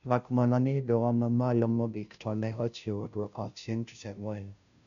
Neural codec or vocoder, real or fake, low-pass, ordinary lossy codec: codec, 16 kHz, 0.5 kbps, FunCodec, trained on Chinese and English, 25 frames a second; fake; 7.2 kHz; none